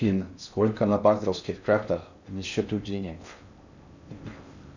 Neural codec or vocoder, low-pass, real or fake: codec, 16 kHz in and 24 kHz out, 0.6 kbps, FocalCodec, streaming, 4096 codes; 7.2 kHz; fake